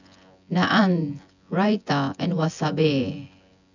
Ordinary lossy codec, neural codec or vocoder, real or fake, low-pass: none; vocoder, 24 kHz, 100 mel bands, Vocos; fake; 7.2 kHz